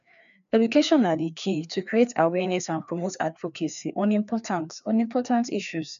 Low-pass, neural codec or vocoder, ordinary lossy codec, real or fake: 7.2 kHz; codec, 16 kHz, 2 kbps, FreqCodec, larger model; MP3, 96 kbps; fake